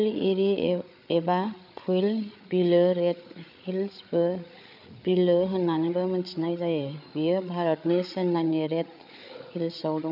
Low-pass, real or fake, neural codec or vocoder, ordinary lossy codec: 5.4 kHz; fake; codec, 16 kHz, 8 kbps, FreqCodec, larger model; none